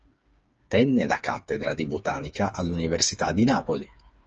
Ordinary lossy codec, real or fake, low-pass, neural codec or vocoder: Opus, 24 kbps; fake; 7.2 kHz; codec, 16 kHz, 4 kbps, FreqCodec, smaller model